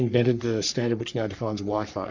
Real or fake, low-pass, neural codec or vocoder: fake; 7.2 kHz; codec, 44.1 kHz, 3.4 kbps, Pupu-Codec